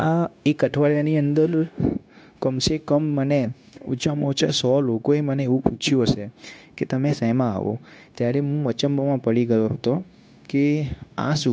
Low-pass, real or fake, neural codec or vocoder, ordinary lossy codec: none; fake; codec, 16 kHz, 0.9 kbps, LongCat-Audio-Codec; none